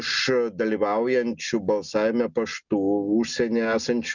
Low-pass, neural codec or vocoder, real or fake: 7.2 kHz; none; real